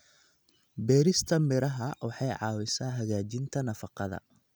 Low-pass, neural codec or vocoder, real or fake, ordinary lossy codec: none; none; real; none